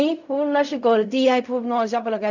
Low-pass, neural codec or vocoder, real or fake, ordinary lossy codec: 7.2 kHz; codec, 16 kHz in and 24 kHz out, 0.4 kbps, LongCat-Audio-Codec, fine tuned four codebook decoder; fake; none